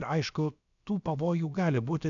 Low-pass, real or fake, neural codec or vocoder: 7.2 kHz; fake; codec, 16 kHz, about 1 kbps, DyCAST, with the encoder's durations